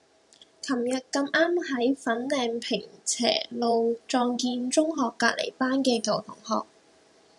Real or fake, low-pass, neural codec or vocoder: fake; 10.8 kHz; vocoder, 44.1 kHz, 128 mel bands every 512 samples, BigVGAN v2